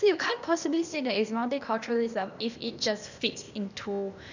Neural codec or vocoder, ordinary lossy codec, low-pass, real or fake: codec, 16 kHz, 0.8 kbps, ZipCodec; none; 7.2 kHz; fake